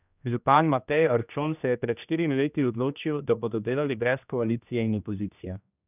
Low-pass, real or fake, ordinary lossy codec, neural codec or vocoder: 3.6 kHz; fake; none; codec, 16 kHz, 1 kbps, X-Codec, HuBERT features, trained on general audio